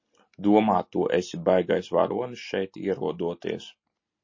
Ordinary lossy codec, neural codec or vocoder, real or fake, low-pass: MP3, 32 kbps; none; real; 7.2 kHz